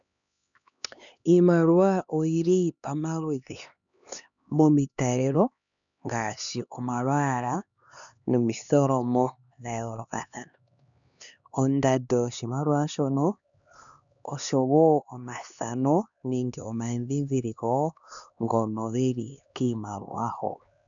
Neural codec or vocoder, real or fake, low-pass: codec, 16 kHz, 2 kbps, X-Codec, HuBERT features, trained on LibriSpeech; fake; 7.2 kHz